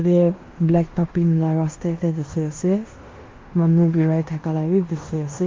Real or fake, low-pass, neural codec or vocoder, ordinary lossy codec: fake; 7.2 kHz; codec, 16 kHz in and 24 kHz out, 0.9 kbps, LongCat-Audio-Codec, fine tuned four codebook decoder; Opus, 32 kbps